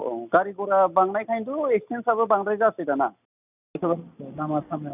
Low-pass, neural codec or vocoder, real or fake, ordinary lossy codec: 3.6 kHz; none; real; none